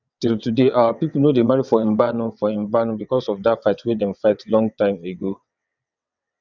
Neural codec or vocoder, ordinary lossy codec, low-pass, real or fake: vocoder, 22.05 kHz, 80 mel bands, WaveNeXt; none; 7.2 kHz; fake